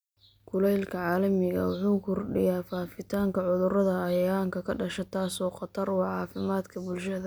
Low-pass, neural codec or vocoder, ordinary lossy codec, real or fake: none; none; none; real